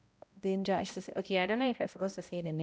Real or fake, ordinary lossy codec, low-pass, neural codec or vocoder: fake; none; none; codec, 16 kHz, 0.5 kbps, X-Codec, HuBERT features, trained on balanced general audio